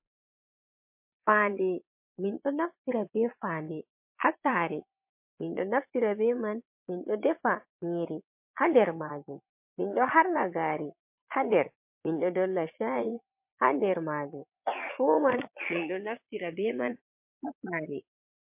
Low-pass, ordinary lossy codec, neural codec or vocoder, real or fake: 3.6 kHz; MP3, 32 kbps; vocoder, 22.05 kHz, 80 mel bands, Vocos; fake